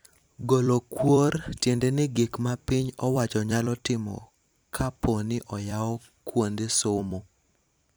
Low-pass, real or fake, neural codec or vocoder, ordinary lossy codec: none; fake; vocoder, 44.1 kHz, 128 mel bands every 256 samples, BigVGAN v2; none